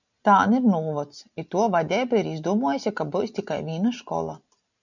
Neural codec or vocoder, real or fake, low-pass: none; real; 7.2 kHz